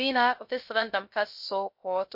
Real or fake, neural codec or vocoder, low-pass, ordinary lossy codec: fake; codec, 16 kHz, 0.3 kbps, FocalCodec; 5.4 kHz; MP3, 32 kbps